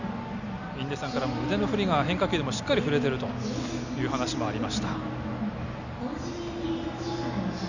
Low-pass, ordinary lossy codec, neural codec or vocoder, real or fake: 7.2 kHz; none; none; real